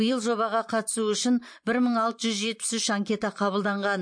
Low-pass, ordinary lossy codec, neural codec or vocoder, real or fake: 9.9 kHz; MP3, 48 kbps; vocoder, 44.1 kHz, 128 mel bands every 512 samples, BigVGAN v2; fake